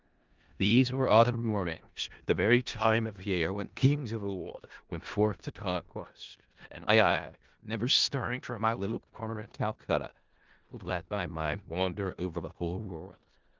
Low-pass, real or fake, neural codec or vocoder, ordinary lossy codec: 7.2 kHz; fake; codec, 16 kHz in and 24 kHz out, 0.4 kbps, LongCat-Audio-Codec, four codebook decoder; Opus, 32 kbps